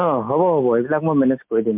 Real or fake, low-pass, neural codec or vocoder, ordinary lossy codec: real; 3.6 kHz; none; MP3, 32 kbps